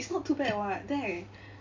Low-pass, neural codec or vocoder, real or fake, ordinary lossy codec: 7.2 kHz; none; real; MP3, 48 kbps